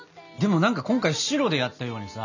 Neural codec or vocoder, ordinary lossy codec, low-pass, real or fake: none; none; 7.2 kHz; real